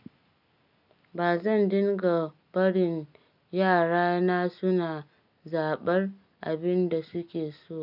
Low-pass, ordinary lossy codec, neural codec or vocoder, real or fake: 5.4 kHz; none; none; real